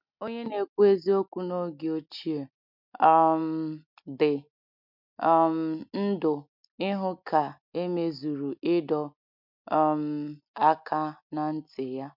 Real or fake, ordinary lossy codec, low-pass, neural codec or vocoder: real; MP3, 48 kbps; 5.4 kHz; none